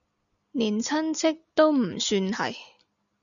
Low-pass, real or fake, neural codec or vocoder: 7.2 kHz; real; none